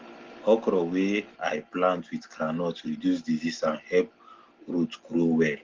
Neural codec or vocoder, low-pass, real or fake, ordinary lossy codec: none; 7.2 kHz; real; Opus, 16 kbps